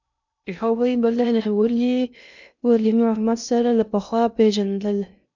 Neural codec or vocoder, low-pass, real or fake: codec, 16 kHz in and 24 kHz out, 0.6 kbps, FocalCodec, streaming, 2048 codes; 7.2 kHz; fake